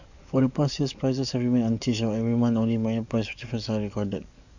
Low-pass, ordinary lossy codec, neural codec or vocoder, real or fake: 7.2 kHz; none; codec, 44.1 kHz, 7.8 kbps, DAC; fake